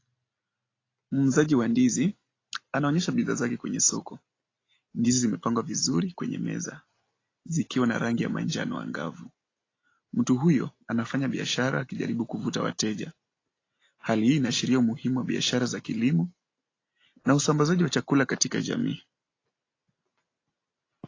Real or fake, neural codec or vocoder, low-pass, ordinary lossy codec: real; none; 7.2 kHz; AAC, 32 kbps